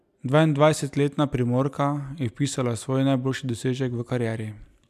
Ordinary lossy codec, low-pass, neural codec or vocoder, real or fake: none; 14.4 kHz; none; real